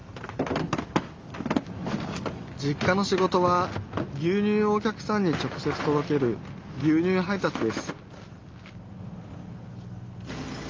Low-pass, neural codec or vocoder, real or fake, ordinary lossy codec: 7.2 kHz; none; real; Opus, 32 kbps